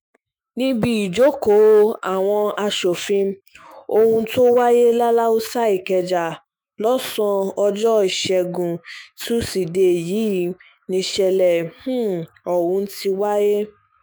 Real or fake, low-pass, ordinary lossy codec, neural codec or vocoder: fake; none; none; autoencoder, 48 kHz, 128 numbers a frame, DAC-VAE, trained on Japanese speech